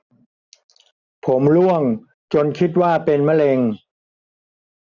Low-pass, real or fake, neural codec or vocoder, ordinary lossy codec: 7.2 kHz; real; none; none